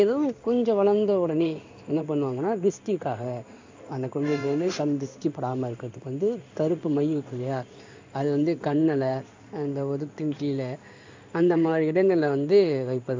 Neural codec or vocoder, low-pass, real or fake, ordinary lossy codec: codec, 16 kHz in and 24 kHz out, 1 kbps, XY-Tokenizer; 7.2 kHz; fake; MP3, 64 kbps